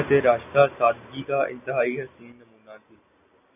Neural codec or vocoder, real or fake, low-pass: none; real; 3.6 kHz